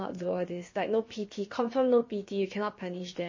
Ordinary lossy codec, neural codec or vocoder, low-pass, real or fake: MP3, 32 kbps; codec, 16 kHz, about 1 kbps, DyCAST, with the encoder's durations; 7.2 kHz; fake